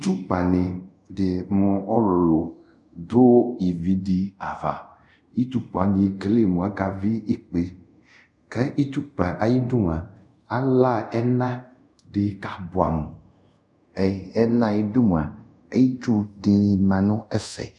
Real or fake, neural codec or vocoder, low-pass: fake; codec, 24 kHz, 0.5 kbps, DualCodec; 10.8 kHz